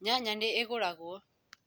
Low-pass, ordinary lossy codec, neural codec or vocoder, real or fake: none; none; none; real